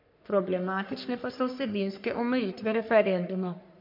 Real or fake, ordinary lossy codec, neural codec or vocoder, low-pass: fake; MP3, 48 kbps; codec, 44.1 kHz, 3.4 kbps, Pupu-Codec; 5.4 kHz